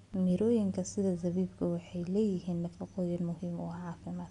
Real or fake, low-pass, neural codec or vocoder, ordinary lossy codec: real; 10.8 kHz; none; MP3, 96 kbps